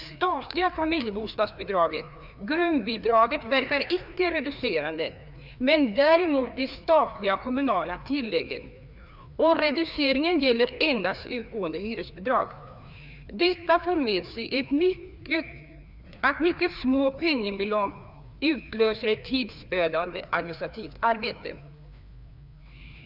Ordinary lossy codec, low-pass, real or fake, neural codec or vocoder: none; 5.4 kHz; fake; codec, 16 kHz, 2 kbps, FreqCodec, larger model